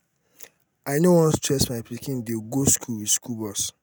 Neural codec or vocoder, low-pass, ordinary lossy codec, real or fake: none; none; none; real